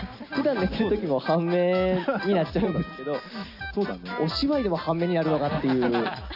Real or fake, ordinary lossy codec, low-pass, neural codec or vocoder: real; none; 5.4 kHz; none